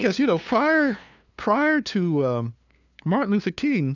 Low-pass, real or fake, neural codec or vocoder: 7.2 kHz; fake; codec, 16 kHz, 2 kbps, FunCodec, trained on LibriTTS, 25 frames a second